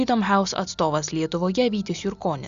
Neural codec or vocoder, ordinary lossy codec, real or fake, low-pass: none; Opus, 64 kbps; real; 7.2 kHz